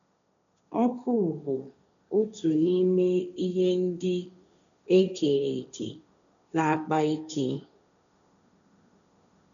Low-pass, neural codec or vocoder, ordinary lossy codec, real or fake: 7.2 kHz; codec, 16 kHz, 1.1 kbps, Voila-Tokenizer; none; fake